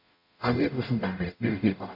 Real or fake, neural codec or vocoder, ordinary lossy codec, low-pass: fake; codec, 44.1 kHz, 0.9 kbps, DAC; none; 5.4 kHz